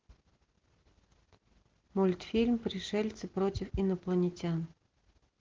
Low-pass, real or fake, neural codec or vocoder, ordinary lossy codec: 7.2 kHz; real; none; Opus, 16 kbps